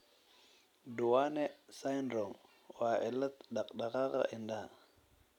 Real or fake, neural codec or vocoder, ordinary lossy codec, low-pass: real; none; none; 19.8 kHz